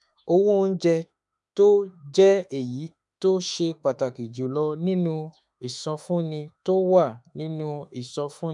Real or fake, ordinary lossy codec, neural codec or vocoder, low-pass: fake; none; autoencoder, 48 kHz, 32 numbers a frame, DAC-VAE, trained on Japanese speech; 10.8 kHz